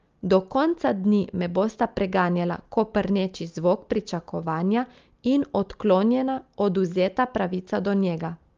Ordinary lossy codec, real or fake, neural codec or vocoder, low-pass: Opus, 32 kbps; real; none; 7.2 kHz